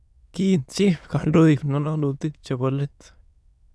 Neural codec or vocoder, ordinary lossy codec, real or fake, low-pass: autoencoder, 22.05 kHz, a latent of 192 numbers a frame, VITS, trained on many speakers; none; fake; none